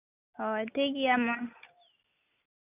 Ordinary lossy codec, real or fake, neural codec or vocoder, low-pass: none; real; none; 3.6 kHz